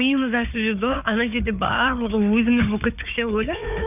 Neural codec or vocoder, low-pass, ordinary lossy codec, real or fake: codec, 16 kHz, 4 kbps, FunCodec, trained on Chinese and English, 50 frames a second; 3.6 kHz; none; fake